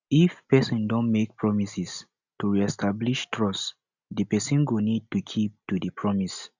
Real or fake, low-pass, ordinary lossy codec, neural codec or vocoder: real; 7.2 kHz; none; none